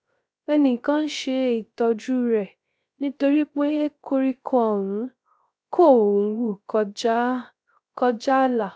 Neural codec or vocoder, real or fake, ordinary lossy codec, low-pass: codec, 16 kHz, 0.3 kbps, FocalCodec; fake; none; none